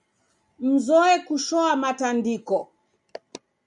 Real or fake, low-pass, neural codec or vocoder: real; 10.8 kHz; none